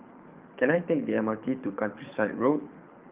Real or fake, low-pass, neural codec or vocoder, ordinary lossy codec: fake; 3.6 kHz; codec, 24 kHz, 6 kbps, HILCodec; Opus, 32 kbps